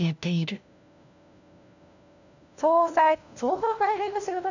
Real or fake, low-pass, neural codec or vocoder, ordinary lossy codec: fake; 7.2 kHz; codec, 16 kHz, 1 kbps, FunCodec, trained on LibriTTS, 50 frames a second; none